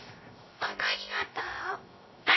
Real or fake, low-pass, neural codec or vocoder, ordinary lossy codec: fake; 7.2 kHz; codec, 16 kHz, 0.3 kbps, FocalCodec; MP3, 24 kbps